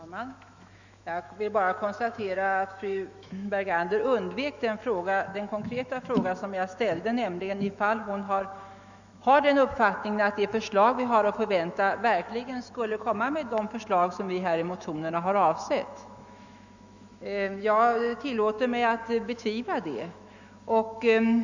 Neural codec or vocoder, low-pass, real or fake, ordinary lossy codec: autoencoder, 48 kHz, 128 numbers a frame, DAC-VAE, trained on Japanese speech; 7.2 kHz; fake; none